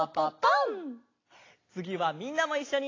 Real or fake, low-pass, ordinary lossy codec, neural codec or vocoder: real; 7.2 kHz; AAC, 32 kbps; none